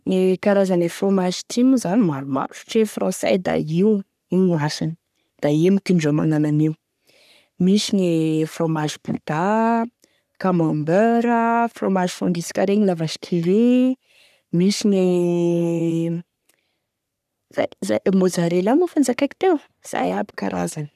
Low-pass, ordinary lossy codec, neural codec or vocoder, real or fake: 14.4 kHz; none; codec, 44.1 kHz, 3.4 kbps, Pupu-Codec; fake